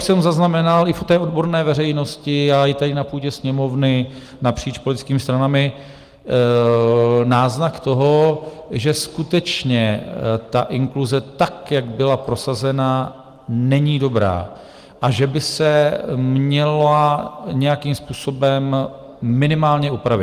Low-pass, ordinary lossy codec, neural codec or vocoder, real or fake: 14.4 kHz; Opus, 24 kbps; none; real